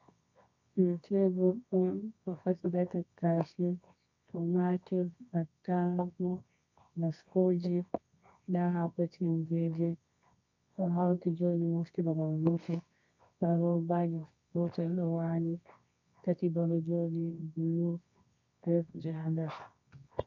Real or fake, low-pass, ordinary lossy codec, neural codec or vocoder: fake; 7.2 kHz; MP3, 64 kbps; codec, 24 kHz, 0.9 kbps, WavTokenizer, medium music audio release